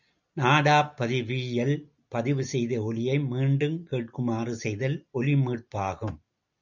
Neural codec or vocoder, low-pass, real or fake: none; 7.2 kHz; real